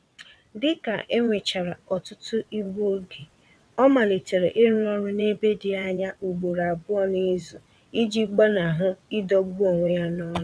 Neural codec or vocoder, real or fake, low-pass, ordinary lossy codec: vocoder, 22.05 kHz, 80 mel bands, WaveNeXt; fake; none; none